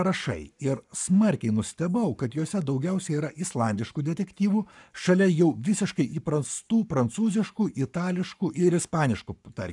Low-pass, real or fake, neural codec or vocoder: 10.8 kHz; fake; codec, 44.1 kHz, 7.8 kbps, Pupu-Codec